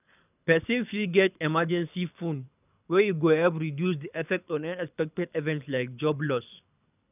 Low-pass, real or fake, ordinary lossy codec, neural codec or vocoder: 3.6 kHz; fake; none; codec, 24 kHz, 6 kbps, HILCodec